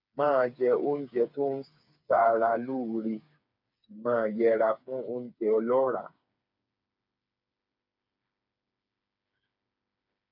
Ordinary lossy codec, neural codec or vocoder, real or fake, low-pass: MP3, 48 kbps; codec, 16 kHz, 4 kbps, FreqCodec, smaller model; fake; 5.4 kHz